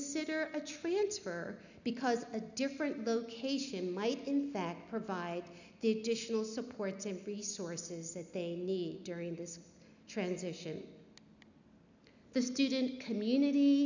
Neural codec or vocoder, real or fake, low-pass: none; real; 7.2 kHz